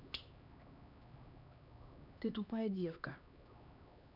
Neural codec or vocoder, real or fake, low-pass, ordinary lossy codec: codec, 16 kHz, 2 kbps, X-Codec, HuBERT features, trained on LibriSpeech; fake; 5.4 kHz; none